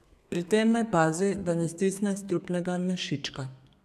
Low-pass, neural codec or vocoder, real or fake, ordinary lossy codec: 14.4 kHz; codec, 32 kHz, 1.9 kbps, SNAC; fake; none